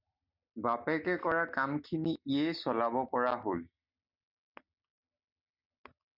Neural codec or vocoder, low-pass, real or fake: none; 5.4 kHz; real